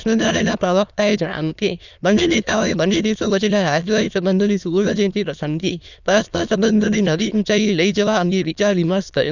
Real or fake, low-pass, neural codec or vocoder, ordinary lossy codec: fake; 7.2 kHz; autoencoder, 22.05 kHz, a latent of 192 numbers a frame, VITS, trained on many speakers; none